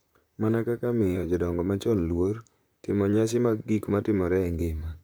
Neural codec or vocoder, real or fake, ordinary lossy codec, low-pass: vocoder, 44.1 kHz, 128 mel bands, Pupu-Vocoder; fake; none; none